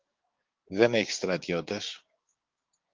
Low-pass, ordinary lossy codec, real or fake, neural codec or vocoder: 7.2 kHz; Opus, 24 kbps; fake; codec, 16 kHz, 6 kbps, DAC